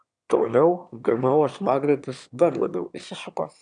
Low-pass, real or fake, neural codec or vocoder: 9.9 kHz; fake; autoencoder, 22.05 kHz, a latent of 192 numbers a frame, VITS, trained on one speaker